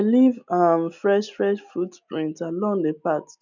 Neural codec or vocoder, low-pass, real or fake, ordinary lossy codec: none; 7.2 kHz; real; none